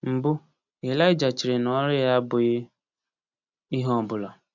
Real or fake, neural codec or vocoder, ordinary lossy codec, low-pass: real; none; none; 7.2 kHz